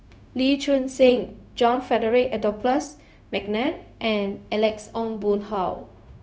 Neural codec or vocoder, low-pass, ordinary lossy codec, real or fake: codec, 16 kHz, 0.4 kbps, LongCat-Audio-Codec; none; none; fake